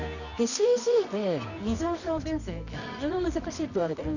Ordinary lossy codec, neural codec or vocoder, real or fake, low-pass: none; codec, 24 kHz, 0.9 kbps, WavTokenizer, medium music audio release; fake; 7.2 kHz